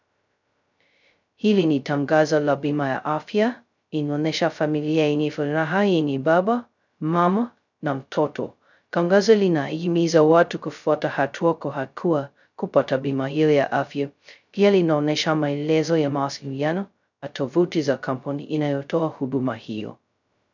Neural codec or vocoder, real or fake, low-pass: codec, 16 kHz, 0.2 kbps, FocalCodec; fake; 7.2 kHz